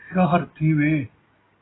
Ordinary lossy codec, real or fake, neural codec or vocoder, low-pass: AAC, 16 kbps; real; none; 7.2 kHz